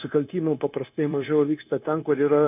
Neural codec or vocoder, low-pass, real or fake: codec, 16 kHz, 0.9 kbps, LongCat-Audio-Codec; 3.6 kHz; fake